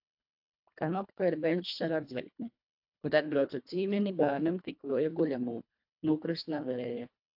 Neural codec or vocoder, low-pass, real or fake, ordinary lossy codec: codec, 24 kHz, 1.5 kbps, HILCodec; 5.4 kHz; fake; AAC, 48 kbps